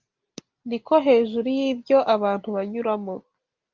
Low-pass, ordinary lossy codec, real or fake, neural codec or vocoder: 7.2 kHz; Opus, 32 kbps; real; none